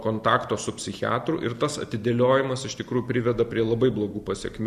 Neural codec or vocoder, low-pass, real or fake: none; 14.4 kHz; real